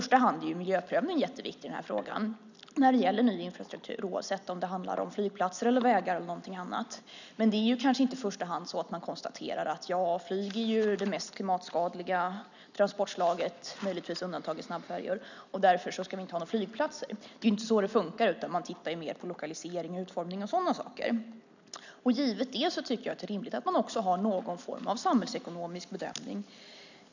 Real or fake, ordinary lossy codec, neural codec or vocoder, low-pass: real; none; none; 7.2 kHz